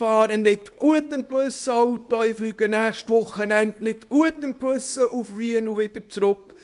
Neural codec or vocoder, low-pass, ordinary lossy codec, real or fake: codec, 24 kHz, 0.9 kbps, WavTokenizer, small release; 10.8 kHz; AAC, 96 kbps; fake